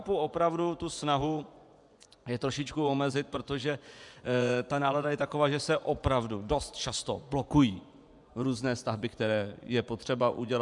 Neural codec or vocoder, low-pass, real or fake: vocoder, 24 kHz, 100 mel bands, Vocos; 10.8 kHz; fake